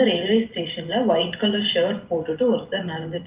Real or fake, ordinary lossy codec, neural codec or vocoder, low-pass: real; Opus, 32 kbps; none; 3.6 kHz